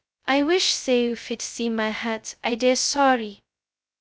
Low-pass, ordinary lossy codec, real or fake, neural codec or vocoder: none; none; fake; codec, 16 kHz, 0.2 kbps, FocalCodec